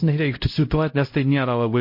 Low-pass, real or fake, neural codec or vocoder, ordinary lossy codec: 5.4 kHz; fake; codec, 16 kHz, 0.5 kbps, X-Codec, HuBERT features, trained on LibriSpeech; MP3, 32 kbps